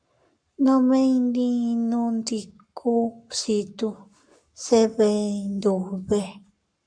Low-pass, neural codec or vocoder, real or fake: 9.9 kHz; codec, 44.1 kHz, 7.8 kbps, Pupu-Codec; fake